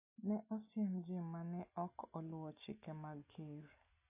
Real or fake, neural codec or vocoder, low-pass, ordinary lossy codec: real; none; 3.6 kHz; AAC, 24 kbps